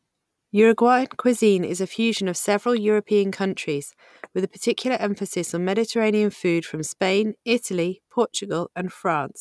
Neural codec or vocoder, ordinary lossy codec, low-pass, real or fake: none; none; none; real